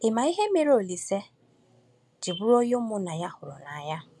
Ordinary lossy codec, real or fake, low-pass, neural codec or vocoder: none; real; none; none